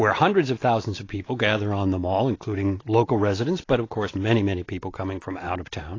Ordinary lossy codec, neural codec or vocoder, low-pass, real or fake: AAC, 32 kbps; none; 7.2 kHz; real